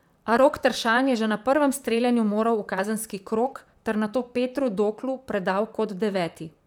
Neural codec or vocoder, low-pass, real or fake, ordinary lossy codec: vocoder, 44.1 kHz, 128 mel bands, Pupu-Vocoder; 19.8 kHz; fake; none